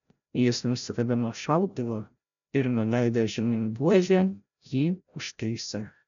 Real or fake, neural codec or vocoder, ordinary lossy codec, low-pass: fake; codec, 16 kHz, 0.5 kbps, FreqCodec, larger model; MP3, 96 kbps; 7.2 kHz